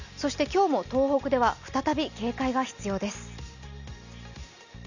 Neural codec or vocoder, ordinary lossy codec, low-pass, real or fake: none; none; 7.2 kHz; real